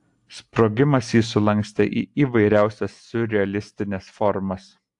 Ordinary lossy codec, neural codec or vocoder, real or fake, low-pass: AAC, 64 kbps; none; real; 10.8 kHz